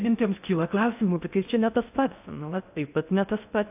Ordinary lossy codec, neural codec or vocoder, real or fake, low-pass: AAC, 32 kbps; codec, 16 kHz in and 24 kHz out, 0.6 kbps, FocalCodec, streaming, 4096 codes; fake; 3.6 kHz